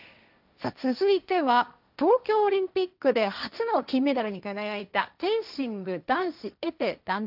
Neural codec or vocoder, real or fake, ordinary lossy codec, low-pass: codec, 16 kHz, 1.1 kbps, Voila-Tokenizer; fake; none; 5.4 kHz